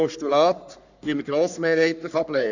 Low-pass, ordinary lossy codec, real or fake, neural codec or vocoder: 7.2 kHz; none; fake; codec, 44.1 kHz, 3.4 kbps, Pupu-Codec